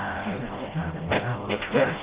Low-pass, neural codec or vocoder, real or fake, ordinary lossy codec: 3.6 kHz; codec, 16 kHz, 0.5 kbps, FreqCodec, smaller model; fake; Opus, 16 kbps